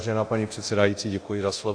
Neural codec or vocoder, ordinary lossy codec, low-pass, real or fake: codec, 24 kHz, 0.9 kbps, DualCodec; AAC, 48 kbps; 9.9 kHz; fake